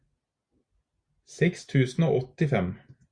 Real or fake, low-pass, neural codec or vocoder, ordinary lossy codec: real; 9.9 kHz; none; Opus, 64 kbps